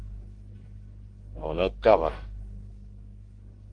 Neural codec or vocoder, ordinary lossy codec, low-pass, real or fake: codec, 44.1 kHz, 1.7 kbps, Pupu-Codec; Opus, 24 kbps; 9.9 kHz; fake